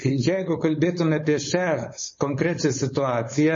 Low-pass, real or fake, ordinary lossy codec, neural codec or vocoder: 7.2 kHz; fake; MP3, 32 kbps; codec, 16 kHz, 4.8 kbps, FACodec